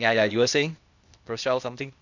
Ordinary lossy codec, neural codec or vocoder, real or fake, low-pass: none; codec, 16 kHz, 0.8 kbps, ZipCodec; fake; 7.2 kHz